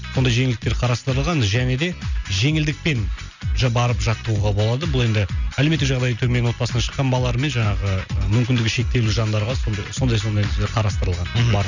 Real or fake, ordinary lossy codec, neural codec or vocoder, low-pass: real; none; none; 7.2 kHz